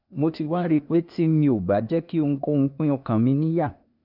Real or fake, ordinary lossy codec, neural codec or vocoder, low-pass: fake; none; codec, 16 kHz, 0.8 kbps, ZipCodec; 5.4 kHz